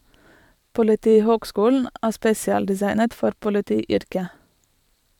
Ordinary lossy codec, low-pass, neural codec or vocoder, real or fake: none; 19.8 kHz; vocoder, 44.1 kHz, 128 mel bands every 512 samples, BigVGAN v2; fake